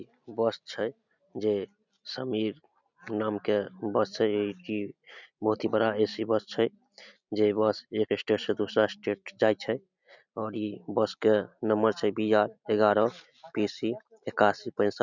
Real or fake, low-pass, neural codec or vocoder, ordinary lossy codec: real; 7.2 kHz; none; none